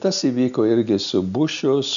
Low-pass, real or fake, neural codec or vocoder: 7.2 kHz; real; none